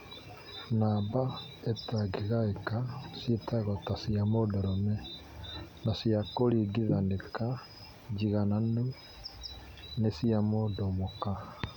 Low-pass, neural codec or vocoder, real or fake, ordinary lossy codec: 19.8 kHz; none; real; MP3, 96 kbps